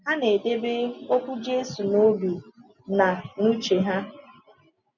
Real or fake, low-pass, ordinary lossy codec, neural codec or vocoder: real; 7.2 kHz; none; none